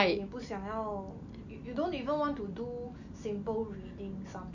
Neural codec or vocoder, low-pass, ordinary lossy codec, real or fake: none; 7.2 kHz; none; real